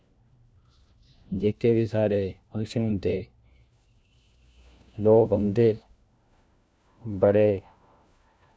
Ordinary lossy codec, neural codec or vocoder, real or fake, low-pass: none; codec, 16 kHz, 1 kbps, FunCodec, trained on LibriTTS, 50 frames a second; fake; none